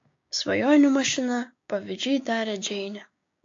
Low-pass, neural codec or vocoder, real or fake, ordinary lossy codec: 7.2 kHz; codec, 16 kHz, 6 kbps, DAC; fake; AAC, 48 kbps